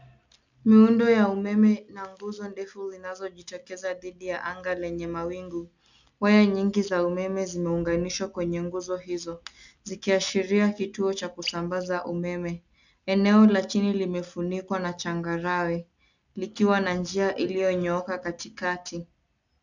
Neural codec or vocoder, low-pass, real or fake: none; 7.2 kHz; real